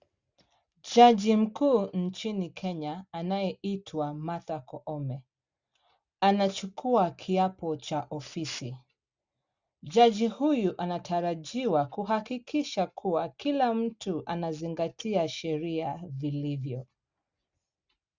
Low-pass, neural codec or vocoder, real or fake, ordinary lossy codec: 7.2 kHz; none; real; Opus, 64 kbps